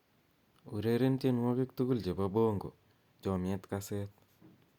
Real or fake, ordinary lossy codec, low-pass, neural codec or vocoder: real; Opus, 64 kbps; 19.8 kHz; none